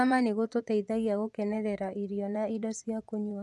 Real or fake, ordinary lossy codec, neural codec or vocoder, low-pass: fake; none; vocoder, 24 kHz, 100 mel bands, Vocos; none